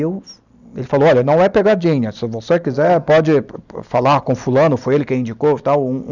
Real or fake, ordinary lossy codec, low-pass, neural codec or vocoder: real; none; 7.2 kHz; none